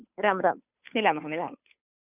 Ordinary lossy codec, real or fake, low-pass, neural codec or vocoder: none; fake; 3.6 kHz; codec, 16 kHz, 2 kbps, FunCodec, trained on Chinese and English, 25 frames a second